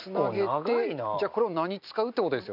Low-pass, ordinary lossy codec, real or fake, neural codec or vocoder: 5.4 kHz; none; real; none